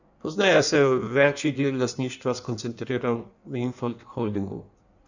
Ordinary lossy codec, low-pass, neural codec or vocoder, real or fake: none; 7.2 kHz; codec, 16 kHz in and 24 kHz out, 1.1 kbps, FireRedTTS-2 codec; fake